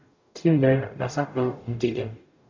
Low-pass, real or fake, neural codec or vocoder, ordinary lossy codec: 7.2 kHz; fake; codec, 44.1 kHz, 0.9 kbps, DAC; MP3, 64 kbps